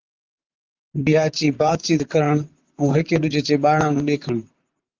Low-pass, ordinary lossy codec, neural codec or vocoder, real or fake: 7.2 kHz; Opus, 32 kbps; vocoder, 44.1 kHz, 128 mel bands, Pupu-Vocoder; fake